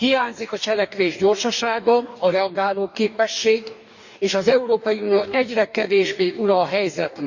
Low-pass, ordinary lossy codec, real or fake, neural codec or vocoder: 7.2 kHz; none; fake; codec, 44.1 kHz, 2.6 kbps, DAC